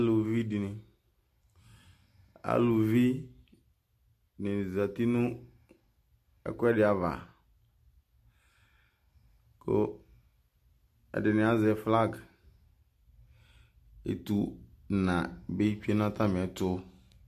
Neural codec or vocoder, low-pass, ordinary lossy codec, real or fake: none; 14.4 kHz; MP3, 64 kbps; real